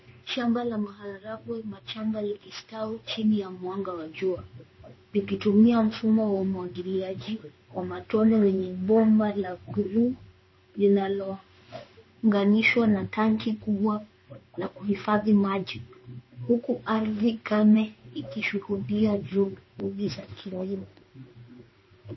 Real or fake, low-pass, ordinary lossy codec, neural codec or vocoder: fake; 7.2 kHz; MP3, 24 kbps; codec, 16 kHz in and 24 kHz out, 1 kbps, XY-Tokenizer